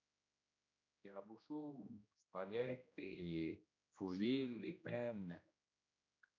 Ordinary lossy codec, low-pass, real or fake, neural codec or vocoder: MP3, 64 kbps; 7.2 kHz; fake; codec, 16 kHz, 1 kbps, X-Codec, HuBERT features, trained on general audio